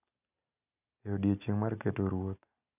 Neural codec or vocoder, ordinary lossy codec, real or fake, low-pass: none; none; real; 3.6 kHz